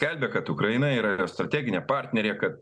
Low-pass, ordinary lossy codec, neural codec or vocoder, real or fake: 9.9 kHz; MP3, 96 kbps; none; real